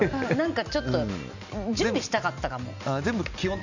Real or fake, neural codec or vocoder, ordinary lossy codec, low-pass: real; none; none; 7.2 kHz